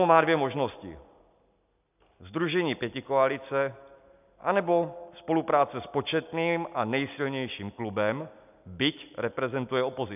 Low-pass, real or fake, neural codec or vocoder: 3.6 kHz; real; none